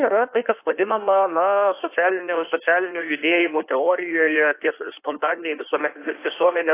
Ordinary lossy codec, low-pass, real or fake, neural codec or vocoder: AAC, 24 kbps; 3.6 kHz; fake; codec, 16 kHz in and 24 kHz out, 1.1 kbps, FireRedTTS-2 codec